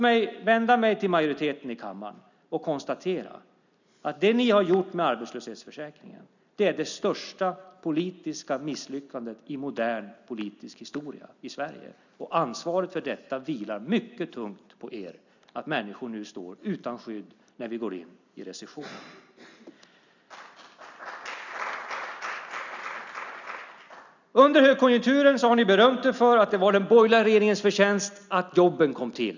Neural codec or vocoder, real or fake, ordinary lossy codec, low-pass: none; real; none; 7.2 kHz